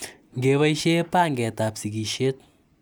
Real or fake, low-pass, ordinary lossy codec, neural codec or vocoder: real; none; none; none